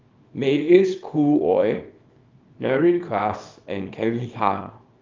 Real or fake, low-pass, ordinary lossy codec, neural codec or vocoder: fake; 7.2 kHz; Opus, 24 kbps; codec, 24 kHz, 0.9 kbps, WavTokenizer, small release